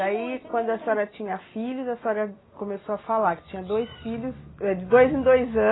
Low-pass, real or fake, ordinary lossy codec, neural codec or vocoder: 7.2 kHz; real; AAC, 16 kbps; none